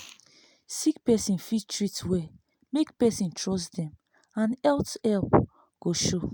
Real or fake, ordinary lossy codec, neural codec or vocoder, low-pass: real; none; none; none